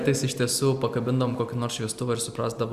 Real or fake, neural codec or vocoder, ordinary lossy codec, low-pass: real; none; Opus, 64 kbps; 14.4 kHz